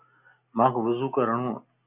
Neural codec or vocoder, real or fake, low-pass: none; real; 3.6 kHz